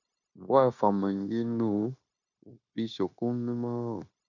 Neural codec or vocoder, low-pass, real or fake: codec, 16 kHz, 0.9 kbps, LongCat-Audio-Codec; 7.2 kHz; fake